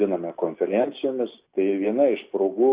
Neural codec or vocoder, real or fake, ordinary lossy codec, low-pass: none; real; Opus, 64 kbps; 3.6 kHz